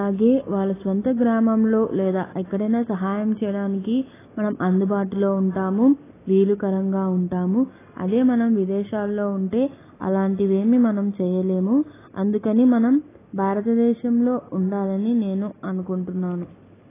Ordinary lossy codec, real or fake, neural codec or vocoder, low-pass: AAC, 16 kbps; real; none; 3.6 kHz